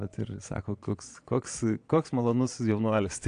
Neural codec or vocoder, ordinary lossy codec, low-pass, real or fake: vocoder, 22.05 kHz, 80 mel bands, Vocos; AAC, 64 kbps; 9.9 kHz; fake